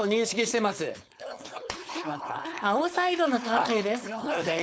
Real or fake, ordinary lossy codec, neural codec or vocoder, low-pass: fake; none; codec, 16 kHz, 4.8 kbps, FACodec; none